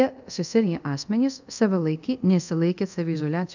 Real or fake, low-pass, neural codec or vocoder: fake; 7.2 kHz; codec, 24 kHz, 0.5 kbps, DualCodec